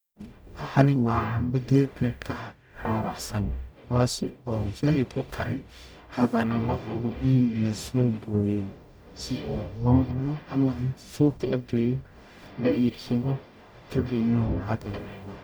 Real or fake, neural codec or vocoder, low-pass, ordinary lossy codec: fake; codec, 44.1 kHz, 0.9 kbps, DAC; none; none